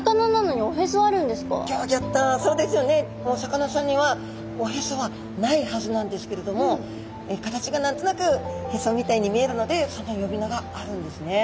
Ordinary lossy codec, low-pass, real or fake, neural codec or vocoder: none; none; real; none